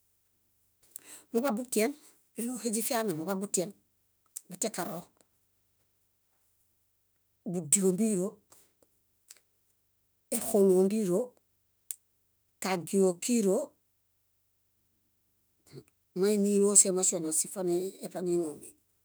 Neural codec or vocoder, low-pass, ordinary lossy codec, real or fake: autoencoder, 48 kHz, 32 numbers a frame, DAC-VAE, trained on Japanese speech; none; none; fake